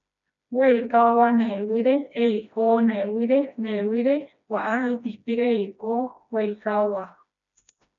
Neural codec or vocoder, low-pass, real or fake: codec, 16 kHz, 1 kbps, FreqCodec, smaller model; 7.2 kHz; fake